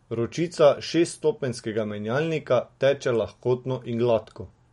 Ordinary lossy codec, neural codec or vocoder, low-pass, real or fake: MP3, 48 kbps; none; 19.8 kHz; real